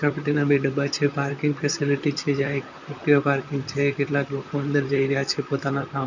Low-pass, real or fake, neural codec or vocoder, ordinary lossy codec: 7.2 kHz; fake; vocoder, 44.1 kHz, 128 mel bands, Pupu-Vocoder; none